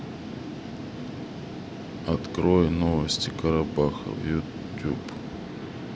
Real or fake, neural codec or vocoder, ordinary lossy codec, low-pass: real; none; none; none